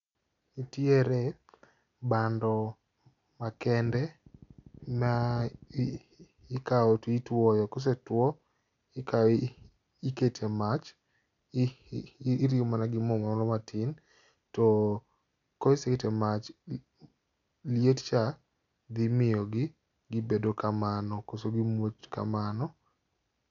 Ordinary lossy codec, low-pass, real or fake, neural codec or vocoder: none; 7.2 kHz; real; none